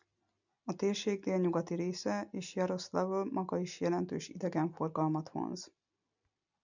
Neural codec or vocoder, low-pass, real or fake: none; 7.2 kHz; real